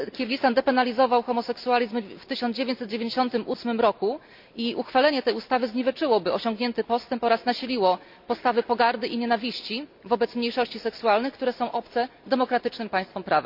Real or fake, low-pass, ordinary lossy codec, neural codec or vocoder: real; 5.4 kHz; MP3, 48 kbps; none